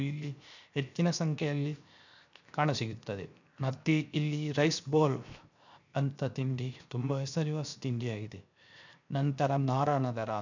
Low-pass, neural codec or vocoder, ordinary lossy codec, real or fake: 7.2 kHz; codec, 16 kHz, 0.7 kbps, FocalCodec; none; fake